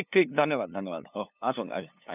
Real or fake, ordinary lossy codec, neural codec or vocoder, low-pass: fake; none; codec, 16 kHz, 2 kbps, FunCodec, trained on LibriTTS, 25 frames a second; 3.6 kHz